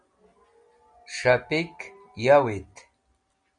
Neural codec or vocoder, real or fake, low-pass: none; real; 9.9 kHz